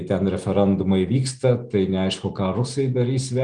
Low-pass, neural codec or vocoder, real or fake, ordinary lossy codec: 9.9 kHz; none; real; Opus, 24 kbps